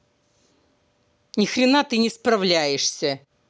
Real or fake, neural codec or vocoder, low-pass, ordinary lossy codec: real; none; none; none